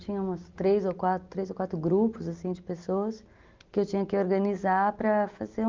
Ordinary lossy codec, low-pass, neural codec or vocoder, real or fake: Opus, 32 kbps; 7.2 kHz; none; real